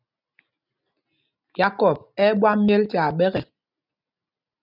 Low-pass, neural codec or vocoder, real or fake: 5.4 kHz; none; real